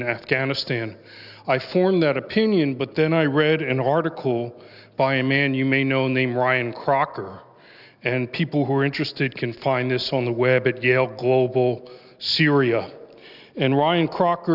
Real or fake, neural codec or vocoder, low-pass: real; none; 5.4 kHz